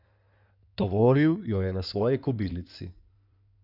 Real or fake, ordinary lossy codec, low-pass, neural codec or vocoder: fake; none; 5.4 kHz; codec, 16 kHz in and 24 kHz out, 2.2 kbps, FireRedTTS-2 codec